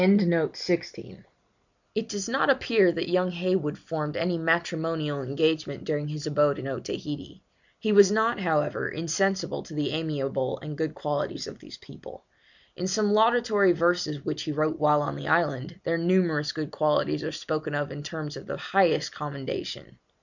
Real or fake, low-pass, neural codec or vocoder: real; 7.2 kHz; none